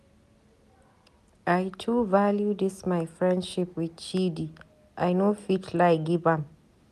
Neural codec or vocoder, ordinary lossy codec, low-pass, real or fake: none; none; 14.4 kHz; real